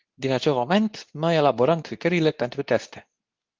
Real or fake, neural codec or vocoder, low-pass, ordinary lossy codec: fake; codec, 24 kHz, 0.9 kbps, WavTokenizer, medium speech release version 2; 7.2 kHz; Opus, 32 kbps